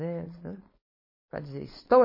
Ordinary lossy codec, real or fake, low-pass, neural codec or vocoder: MP3, 24 kbps; fake; 5.4 kHz; codec, 16 kHz, 4.8 kbps, FACodec